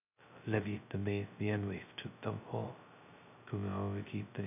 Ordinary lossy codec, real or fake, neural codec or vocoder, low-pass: none; fake; codec, 16 kHz, 0.2 kbps, FocalCodec; 3.6 kHz